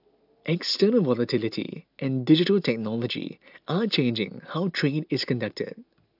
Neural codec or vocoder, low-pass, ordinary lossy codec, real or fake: none; 5.4 kHz; AAC, 48 kbps; real